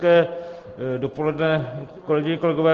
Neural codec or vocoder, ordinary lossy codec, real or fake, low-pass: none; Opus, 16 kbps; real; 7.2 kHz